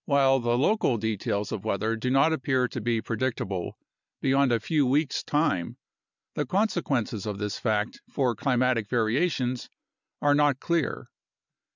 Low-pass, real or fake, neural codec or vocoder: 7.2 kHz; real; none